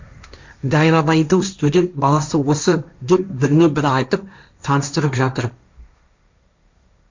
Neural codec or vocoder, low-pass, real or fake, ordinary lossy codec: codec, 16 kHz, 1.1 kbps, Voila-Tokenizer; 7.2 kHz; fake; MP3, 64 kbps